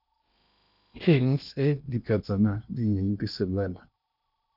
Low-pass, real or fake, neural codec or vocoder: 5.4 kHz; fake; codec, 16 kHz in and 24 kHz out, 0.8 kbps, FocalCodec, streaming, 65536 codes